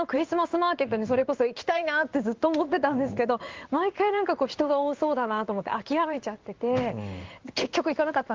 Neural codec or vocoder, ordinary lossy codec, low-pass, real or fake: codec, 16 kHz in and 24 kHz out, 1 kbps, XY-Tokenizer; Opus, 32 kbps; 7.2 kHz; fake